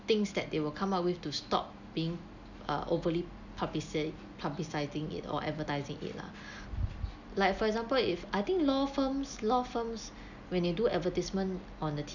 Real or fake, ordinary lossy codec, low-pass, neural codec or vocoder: real; none; 7.2 kHz; none